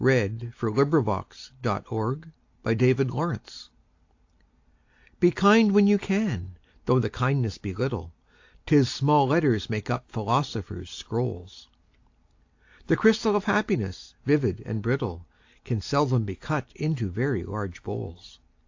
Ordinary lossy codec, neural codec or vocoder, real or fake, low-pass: AAC, 48 kbps; none; real; 7.2 kHz